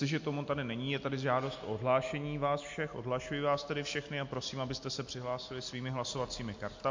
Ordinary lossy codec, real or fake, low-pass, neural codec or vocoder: MP3, 48 kbps; real; 7.2 kHz; none